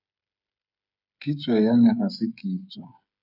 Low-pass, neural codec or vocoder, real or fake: 5.4 kHz; codec, 16 kHz, 8 kbps, FreqCodec, smaller model; fake